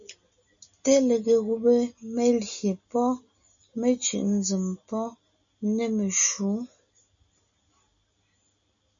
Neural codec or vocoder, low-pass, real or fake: none; 7.2 kHz; real